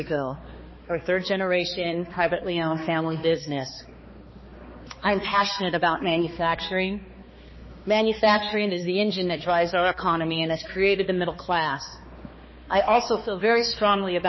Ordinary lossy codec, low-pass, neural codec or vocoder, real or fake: MP3, 24 kbps; 7.2 kHz; codec, 16 kHz, 2 kbps, X-Codec, HuBERT features, trained on balanced general audio; fake